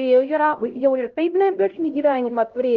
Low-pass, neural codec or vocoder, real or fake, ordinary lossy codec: 7.2 kHz; codec, 16 kHz, 0.5 kbps, X-Codec, HuBERT features, trained on LibriSpeech; fake; Opus, 32 kbps